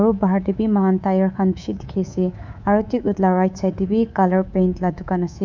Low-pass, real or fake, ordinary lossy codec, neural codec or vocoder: 7.2 kHz; fake; none; codec, 24 kHz, 3.1 kbps, DualCodec